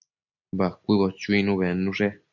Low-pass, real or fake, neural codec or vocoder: 7.2 kHz; real; none